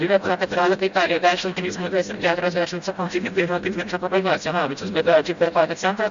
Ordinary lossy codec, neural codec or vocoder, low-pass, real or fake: Opus, 64 kbps; codec, 16 kHz, 0.5 kbps, FreqCodec, smaller model; 7.2 kHz; fake